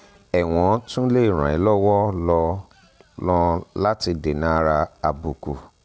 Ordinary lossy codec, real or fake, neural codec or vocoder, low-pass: none; real; none; none